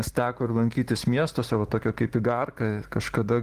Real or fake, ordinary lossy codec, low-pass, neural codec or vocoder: real; Opus, 16 kbps; 14.4 kHz; none